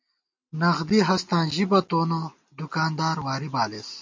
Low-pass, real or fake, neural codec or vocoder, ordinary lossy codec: 7.2 kHz; real; none; MP3, 48 kbps